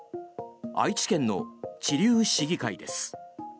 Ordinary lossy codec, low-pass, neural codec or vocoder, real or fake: none; none; none; real